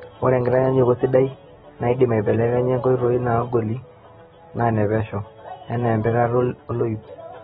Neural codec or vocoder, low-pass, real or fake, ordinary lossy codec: none; 19.8 kHz; real; AAC, 16 kbps